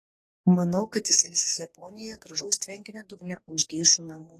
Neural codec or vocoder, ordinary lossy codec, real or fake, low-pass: codec, 44.1 kHz, 2.6 kbps, DAC; AAC, 48 kbps; fake; 14.4 kHz